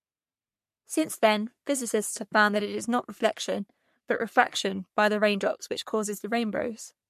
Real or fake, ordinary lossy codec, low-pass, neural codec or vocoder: fake; MP3, 64 kbps; 14.4 kHz; codec, 44.1 kHz, 3.4 kbps, Pupu-Codec